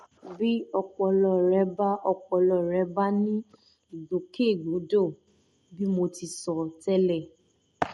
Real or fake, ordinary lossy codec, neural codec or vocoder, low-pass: real; MP3, 48 kbps; none; 19.8 kHz